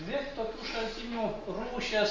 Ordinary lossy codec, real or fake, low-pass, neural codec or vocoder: Opus, 32 kbps; real; 7.2 kHz; none